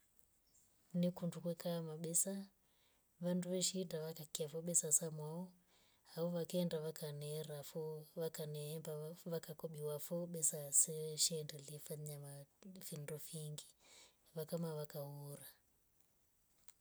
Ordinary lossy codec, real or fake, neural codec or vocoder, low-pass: none; real; none; none